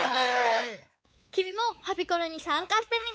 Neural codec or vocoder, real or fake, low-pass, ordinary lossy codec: codec, 16 kHz, 4 kbps, X-Codec, WavLM features, trained on Multilingual LibriSpeech; fake; none; none